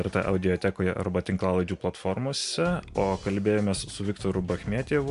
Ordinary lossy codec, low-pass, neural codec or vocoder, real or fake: MP3, 64 kbps; 10.8 kHz; none; real